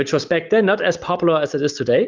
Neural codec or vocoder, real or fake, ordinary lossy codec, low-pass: none; real; Opus, 24 kbps; 7.2 kHz